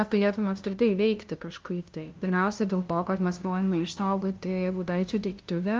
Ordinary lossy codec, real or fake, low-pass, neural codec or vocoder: Opus, 24 kbps; fake; 7.2 kHz; codec, 16 kHz, 0.5 kbps, FunCodec, trained on LibriTTS, 25 frames a second